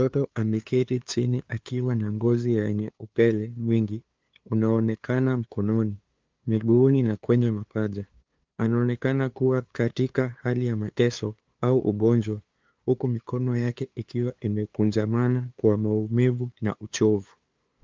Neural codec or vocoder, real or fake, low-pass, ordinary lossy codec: codec, 16 kHz, 2 kbps, FunCodec, trained on LibriTTS, 25 frames a second; fake; 7.2 kHz; Opus, 32 kbps